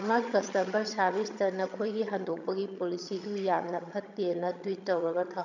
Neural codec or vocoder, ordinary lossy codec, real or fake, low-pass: vocoder, 22.05 kHz, 80 mel bands, HiFi-GAN; none; fake; 7.2 kHz